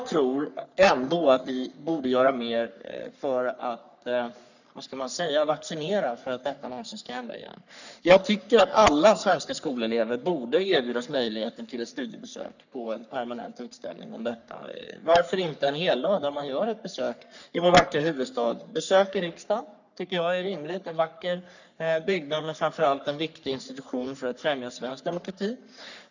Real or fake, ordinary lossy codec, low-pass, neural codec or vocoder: fake; none; 7.2 kHz; codec, 44.1 kHz, 3.4 kbps, Pupu-Codec